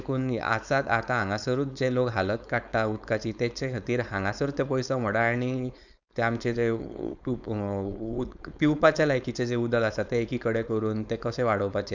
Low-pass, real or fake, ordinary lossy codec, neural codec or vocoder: 7.2 kHz; fake; none; codec, 16 kHz, 4.8 kbps, FACodec